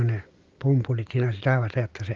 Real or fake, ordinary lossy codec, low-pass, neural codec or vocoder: real; Opus, 32 kbps; 7.2 kHz; none